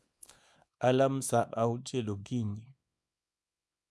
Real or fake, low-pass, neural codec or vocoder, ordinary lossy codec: fake; none; codec, 24 kHz, 0.9 kbps, WavTokenizer, small release; none